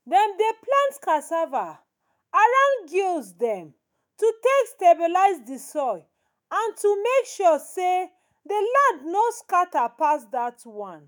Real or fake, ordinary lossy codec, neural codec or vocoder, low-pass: fake; none; autoencoder, 48 kHz, 128 numbers a frame, DAC-VAE, trained on Japanese speech; none